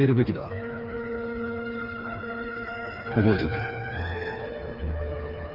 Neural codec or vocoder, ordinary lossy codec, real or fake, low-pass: codec, 16 kHz, 4 kbps, FreqCodec, smaller model; Opus, 24 kbps; fake; 5.4 kHz